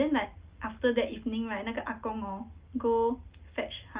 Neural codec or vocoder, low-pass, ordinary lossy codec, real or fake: none; 3.6 kHz; Opus, 32 kbps; real